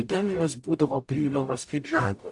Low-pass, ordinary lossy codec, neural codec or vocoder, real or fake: 10.8 kHz; AAC, 64 kbps; codec, 44.1 kHz, 0.9 kbps, DAC; fake